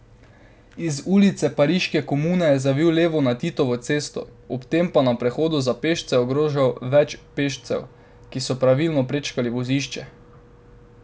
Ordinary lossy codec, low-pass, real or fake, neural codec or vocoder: none; none; real; none